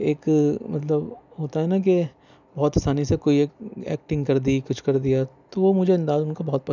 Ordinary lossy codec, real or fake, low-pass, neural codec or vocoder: none; real; 7.2 kHz; none